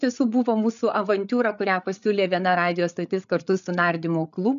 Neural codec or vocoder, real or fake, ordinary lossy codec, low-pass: codec, 16 kHz, 8 kbps, FreqCodec, larger model; fake; MP3, 96 kbps; 7.2 kHz